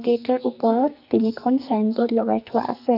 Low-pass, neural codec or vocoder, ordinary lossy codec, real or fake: 5.4 kHz; codec, 44.1 kHz, 2.6 kbps, SNAC; none; fake